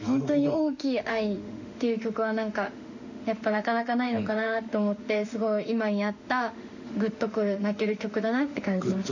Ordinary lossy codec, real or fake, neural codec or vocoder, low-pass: none; fake; autoencoder, 48 kHz, 32 numbers a frame, DAC-VAE, trained on Japanese speech; 7.2 kHz